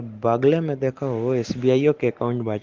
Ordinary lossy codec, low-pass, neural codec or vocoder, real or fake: Opus, 16 kbps; 7.2 kHz; none; real